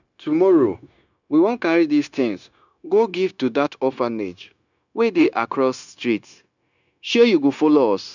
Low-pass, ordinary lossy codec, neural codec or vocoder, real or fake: 7.2 kHz; none; codec, 16 kHz, 0.9 kbps, LongCat-Audio-Codec; fake